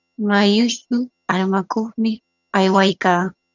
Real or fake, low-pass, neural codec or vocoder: fake; 7.2 kHz; vocoder, 22.05 kHz, 80 mel bands, HiFi-GAN